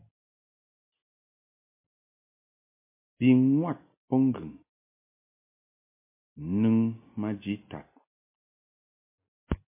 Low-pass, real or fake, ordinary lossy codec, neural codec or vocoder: 3.6 kHz; real; MP3, 24 kbps; none